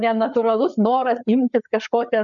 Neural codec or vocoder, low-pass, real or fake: codec, 16 kHz, 4 kbps, FreqCodec, larger model; 7.2 kHz; fake